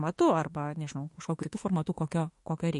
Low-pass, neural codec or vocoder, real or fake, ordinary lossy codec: 14.4 kHz; autoencoder, 48 kHz, 32 numbers a frame, DAC-VAE, trained on Japanese speech; fake; MP3, 48 kbps